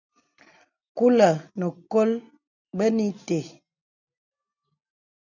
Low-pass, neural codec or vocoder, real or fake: 7.2 kHz; none; real